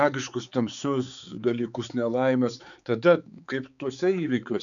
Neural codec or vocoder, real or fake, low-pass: codec, 16 kHz, 4 kbps, X-Codec, HuBERT features, trained on balanced general audio; fake; 7.2 kHz